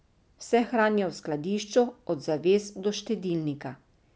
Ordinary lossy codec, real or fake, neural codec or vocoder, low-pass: none; real; none; none